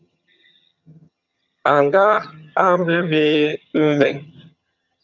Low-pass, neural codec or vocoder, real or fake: 7.2 kHz; vocoder, 22.05 kHz, 80 mel bands, HiFi-GAN; fake